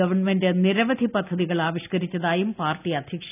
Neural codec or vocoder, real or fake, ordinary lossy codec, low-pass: none; real; none; 3.6 kHz